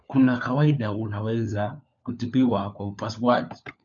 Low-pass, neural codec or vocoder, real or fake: 7.2 kHz; codec, 16 kHz, 4 kbps, FunCodec, trained on LibriTTS, 50 frames a second; fake